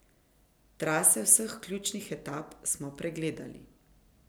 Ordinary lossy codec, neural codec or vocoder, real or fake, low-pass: none; none; real; none